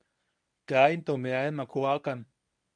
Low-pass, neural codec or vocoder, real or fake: 9.9 kHz; codec, 24 kHz, 0.9 kbps, WavTokenizer, medium speech release version 1; fake